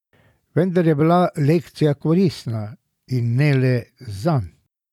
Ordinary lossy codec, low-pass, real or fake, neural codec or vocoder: none; 19.8 kHz; real; none